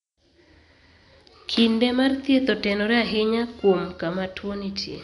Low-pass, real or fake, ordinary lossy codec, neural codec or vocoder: 10.8 kHz; real; none; none